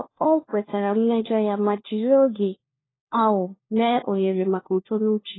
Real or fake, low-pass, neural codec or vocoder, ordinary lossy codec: fake; 7.2 kHz; codec, 16 kHz, 1 kbps, FunCodec, trained on LibriTTS, 50 frames a second; AAC, 16 kbps